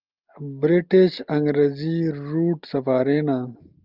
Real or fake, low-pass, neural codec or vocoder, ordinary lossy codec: real; 5.4 kHz; none; Opus, 24 kbps